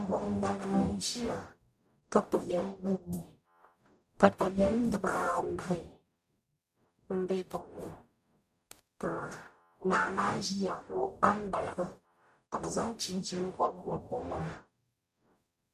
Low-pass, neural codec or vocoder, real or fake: 14.4 kHz; codec, 44.1 kHz, 0.9 kbps, DAC; fake